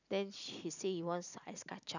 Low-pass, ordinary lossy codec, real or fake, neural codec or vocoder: 7.2 kHz; none; real; none